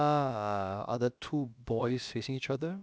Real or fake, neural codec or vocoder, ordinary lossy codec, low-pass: fake; codec, 16 kHz, about 1 kbps, DyCAST, with the encoder's durations; none; none